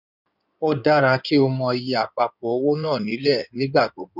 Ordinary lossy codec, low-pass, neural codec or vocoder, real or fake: none; 5.4 kHz; codec, 16 kHz in and 24 kHz out, 2.2 kbps, FireRedTTS-2 codec; fake